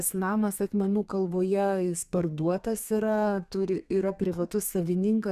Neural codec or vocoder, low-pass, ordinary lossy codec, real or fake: codec, 32 kHz, 1.9 kbps, SNAC; 14.4 kHz; Opus, 64 kbps; fake